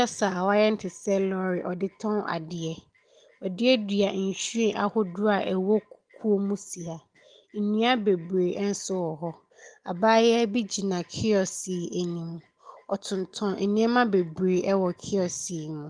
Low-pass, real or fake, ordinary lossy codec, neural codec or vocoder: 9.9 kHz; real; Opus, 24 kbps; none